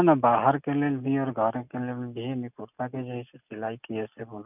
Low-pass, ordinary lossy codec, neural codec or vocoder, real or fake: 3.6 kHz; none; none; real